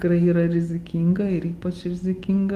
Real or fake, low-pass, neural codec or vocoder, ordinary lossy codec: real; 14.4 kHz; none; Opus, 24 kbps